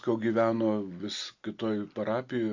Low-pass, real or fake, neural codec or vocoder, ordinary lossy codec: 7.2 kHz; real; none; AAC, 48 kbps